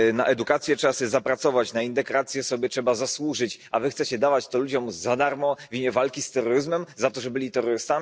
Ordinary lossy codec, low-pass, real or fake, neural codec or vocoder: none; none; real; none